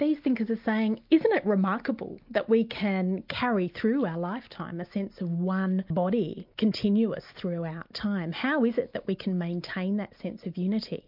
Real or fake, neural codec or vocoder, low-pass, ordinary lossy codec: real; none; 5.4 kHz; MP3, 48 kbps